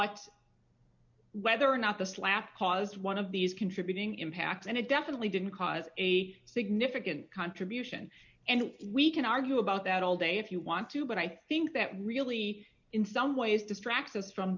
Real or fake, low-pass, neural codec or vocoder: real; 7.2 kHz; none